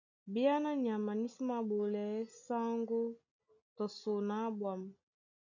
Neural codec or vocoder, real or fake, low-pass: none; real; 7.2 kHz